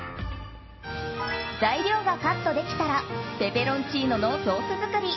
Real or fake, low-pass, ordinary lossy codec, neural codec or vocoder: real; 7.2 kHz; MP3, 24 kbps; none